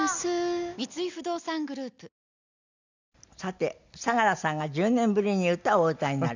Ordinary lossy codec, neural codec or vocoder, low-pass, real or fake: none; none; 7.2 kHz; real